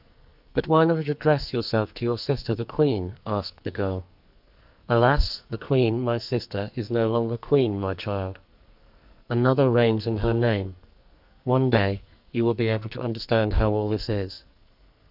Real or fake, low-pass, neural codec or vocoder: fake; 5.4 kHz; codec, 44.1 kHz, 2.6 kbps, SNAC